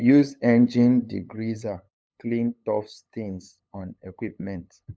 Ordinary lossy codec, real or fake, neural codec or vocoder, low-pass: none; fake; codec, 16 kHz, 8 kbps, FunCodec, trained on LibriTTS, 25 frames a second; none